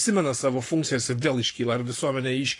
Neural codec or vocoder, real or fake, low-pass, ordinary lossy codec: codec, 44.1 kHz, 7.8 kbps, Pupu-Codec; fake; 10.8 kHz; AAC, 48 kbps